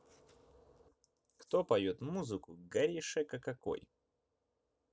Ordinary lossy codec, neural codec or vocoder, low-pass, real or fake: none; none; none; real